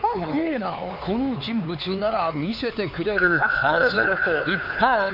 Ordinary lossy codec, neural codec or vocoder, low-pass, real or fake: none; codec, 16 kHz, 4 kbps, X-Codec, HuBERT features, trained on LibriSpeech; 5.4 kHz; fake